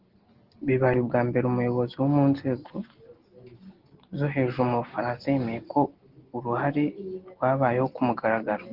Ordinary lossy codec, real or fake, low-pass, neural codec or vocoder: Opus, 16 kbps; real; 5.4 kHz; none